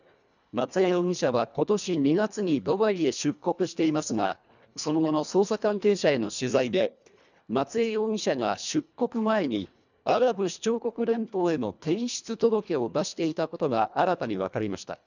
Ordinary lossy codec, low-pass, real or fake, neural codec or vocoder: none; 7.2 kHz; fake; codec, 24 kHz, 1.5 kbps, HILCodec